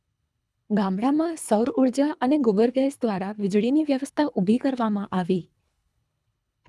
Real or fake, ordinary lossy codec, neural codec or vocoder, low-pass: fake; none; codec, 24 kHz, 3 kbps, HILCodec; none